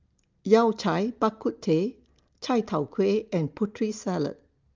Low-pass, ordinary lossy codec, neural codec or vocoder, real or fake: 7.2 kHz; Opus, 24 kbps; none; real